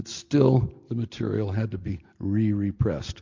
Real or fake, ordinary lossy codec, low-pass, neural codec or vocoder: real; MP3, 48 kbps; 7.2 kHz; none